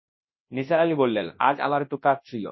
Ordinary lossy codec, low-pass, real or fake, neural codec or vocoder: MP3, 24 kbps; 7.2 kHz; fake; codec, 24 kHz, 0.9 kbps, WavTokenizer, large speech release